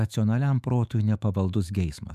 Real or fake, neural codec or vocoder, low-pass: fake; autoencoder, 48 kHz, 128 numbers a frame, DAC-VAE, trained on Japanese speech; 14.4 kHz